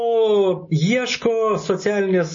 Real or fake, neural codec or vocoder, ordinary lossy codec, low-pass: real; none; MP3, 32 kbps; 7.2 kHz